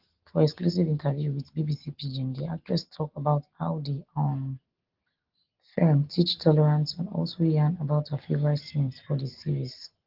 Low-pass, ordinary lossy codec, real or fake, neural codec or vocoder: 5.4 kHz; Opus, 16 kbps; real; none